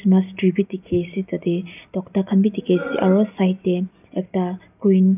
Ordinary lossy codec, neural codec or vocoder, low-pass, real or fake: none; none; 3.6 kHz; real